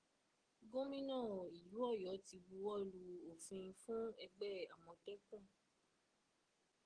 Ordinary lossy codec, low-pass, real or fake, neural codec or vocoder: Opus, 16 kbps; 9.9 kHz; real; none